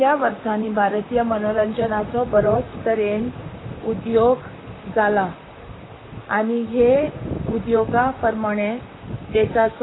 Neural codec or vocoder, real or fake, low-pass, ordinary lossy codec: vocoder, 44.1 kHz, 128 mel bands, Pupu-Vocoder; fake; 7.2 kHz; AAC, 16 kbps